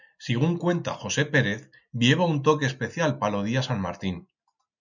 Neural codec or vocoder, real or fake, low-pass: none; real; 7.2 kHz